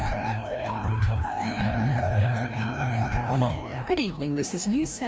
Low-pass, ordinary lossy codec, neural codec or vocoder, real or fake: none; none; codec, 16 kHz, 1 kbps, FreqCodec, larger model; fake